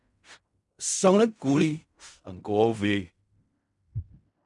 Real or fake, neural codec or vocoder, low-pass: fake; codec, 16 kHz in and 24 kHz out, 0.4 kbps, LongCat-Audio-Codec, fine tuned four codebook decoder; 10.8 kHz